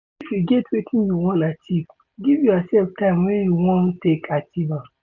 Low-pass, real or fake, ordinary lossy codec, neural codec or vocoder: 7.2 kHz; real; none; none